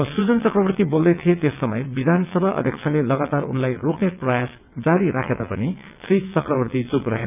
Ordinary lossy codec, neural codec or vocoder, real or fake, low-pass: none; vocoder, 22.05 kHz, 80 mel bands, WaveNeXt; fake; 3.6 kHz